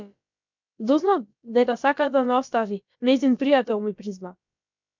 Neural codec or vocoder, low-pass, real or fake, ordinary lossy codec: codec, 16 kHz, about 1 kbps, DyCAST, with the encoder's durations; 7.2 kHz; fake; MP3, 64 kbps